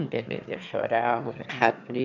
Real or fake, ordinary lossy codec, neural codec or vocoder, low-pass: fake; none; autoencoder, 22.05 kHz, a latent of 192 numbers a frame, VITS, trained on one speaker; 7.2 kHz